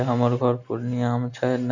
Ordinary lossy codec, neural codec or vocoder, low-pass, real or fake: none; none; 7.2 kHz; real